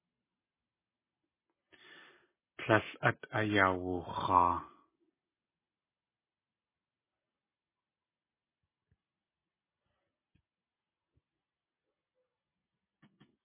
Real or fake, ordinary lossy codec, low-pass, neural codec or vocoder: real; MP3, 16 kbps; 3.6 kHz; none